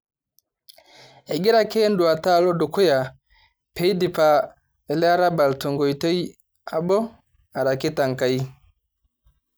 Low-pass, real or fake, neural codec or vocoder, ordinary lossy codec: none; real; none; none